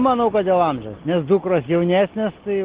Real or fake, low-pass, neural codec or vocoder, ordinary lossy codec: real; 3.6 kHz; none; Opus, 16 kbps